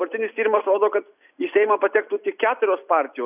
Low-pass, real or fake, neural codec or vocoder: 3.6 kHz; real; none